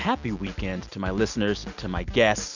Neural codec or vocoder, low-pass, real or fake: none; 7.2 kHz; real